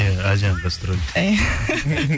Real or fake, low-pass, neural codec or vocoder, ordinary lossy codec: real; none; none; none